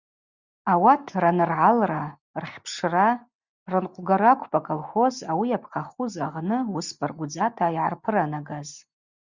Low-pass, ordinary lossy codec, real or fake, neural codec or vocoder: 7.2 kHz; Opus, 64 kbps; real; none